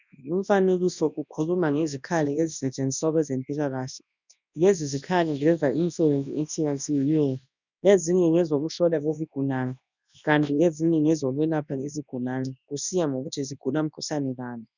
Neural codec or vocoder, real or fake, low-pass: codec, 24 kHz, 0.9 kbps, WavTokenizer, large speech release; fake; 7.2 kHz